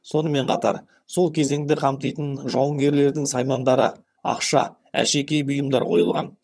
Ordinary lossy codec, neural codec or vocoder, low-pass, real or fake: none; vocoder, 22.05 kHz, 80 mel bands, HiFi-GAN; none; fake